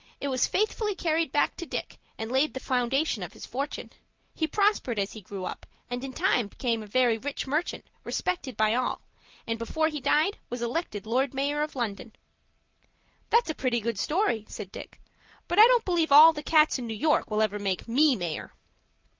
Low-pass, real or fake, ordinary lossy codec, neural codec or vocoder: 7.2 kHz; real; Opus, 32 kbps; none